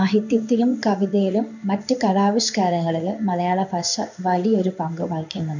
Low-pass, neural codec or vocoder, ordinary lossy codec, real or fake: 7.2 kHz; codec, 16 kHz in and 24 kHz out, 1 kbps, XY-Tokenizer; none; fake